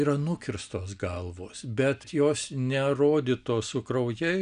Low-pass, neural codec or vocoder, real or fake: 9.9 kHz; none; real